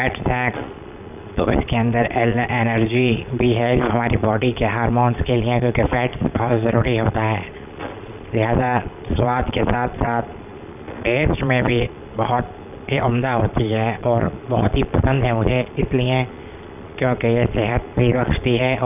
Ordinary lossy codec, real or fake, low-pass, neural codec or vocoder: none; fake; 3.6 kHz; vocoder, 22.05 kHz, 80 mel bands, Vocos